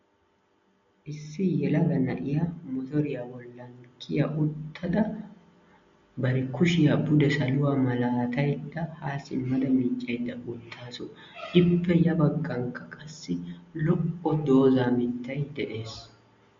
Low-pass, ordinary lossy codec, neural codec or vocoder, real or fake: 7.2 kHz; MP3, 48 kbps; none; real